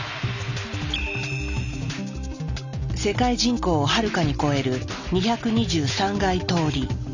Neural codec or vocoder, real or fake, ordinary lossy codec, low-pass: none; real; none; 7.2 kHz